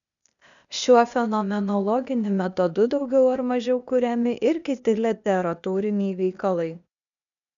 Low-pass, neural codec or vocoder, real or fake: 7.2 kHz; codec, 16 kHz, 0.8 kbps, ZipCodec; fake